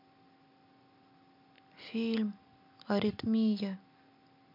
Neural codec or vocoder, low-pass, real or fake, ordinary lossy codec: none; 5.4 kHz; real; none